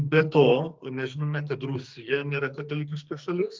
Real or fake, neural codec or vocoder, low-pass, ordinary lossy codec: fake; codec, 44.1 kHz, 2.6 kbps, SNAC; 7.2 kHz; Opus, 32 kbps